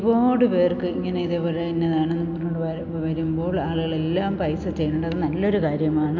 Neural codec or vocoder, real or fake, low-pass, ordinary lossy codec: none; real; 7.2 kHz; none